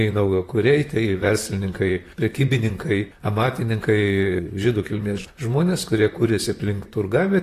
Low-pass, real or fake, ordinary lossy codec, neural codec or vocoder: 14.4 kHz; fake; AAC, 48 kbps; vocoder, 44.1 kHz, 128 mel bands, Pupu-Vocoder